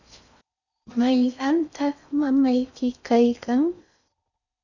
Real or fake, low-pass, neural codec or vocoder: fake; 7.2 kHz; codec, 16 kHz in and 24 kHz out, 0.6 kbps, FocalCodec, streaming, 4096 codes